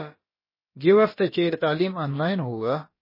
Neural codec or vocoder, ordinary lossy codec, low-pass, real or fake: codec, 16 kHz, about 1 kbps, DyCAST, with the encoder's durations; MP3, 24 kbps; 5.4 kHz; fake